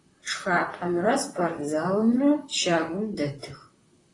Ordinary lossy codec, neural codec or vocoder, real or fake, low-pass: AAC, 32 kbps; vocoder, 44.1 kHz, 128 mel bands, Pupu-Vocoder; fake; 10.8 kHz